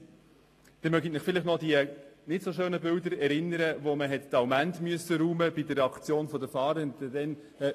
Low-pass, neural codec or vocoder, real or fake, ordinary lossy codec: 14.4 kHz; none; real; AAC, 48 kbps